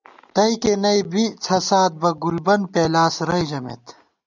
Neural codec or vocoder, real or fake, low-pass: none; real; 7.2 kHz